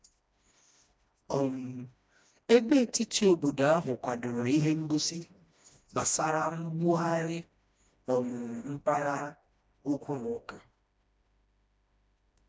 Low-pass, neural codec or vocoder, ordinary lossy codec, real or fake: none; codec, 16 kHz, 1 kbps, FreqCodec, smaller model; none; fake